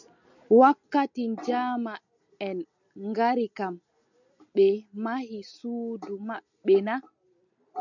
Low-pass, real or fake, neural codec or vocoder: 7.2 kHz; real; none